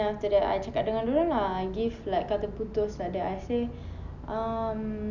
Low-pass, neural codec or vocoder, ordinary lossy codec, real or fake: 7.2 kHz; none; none; real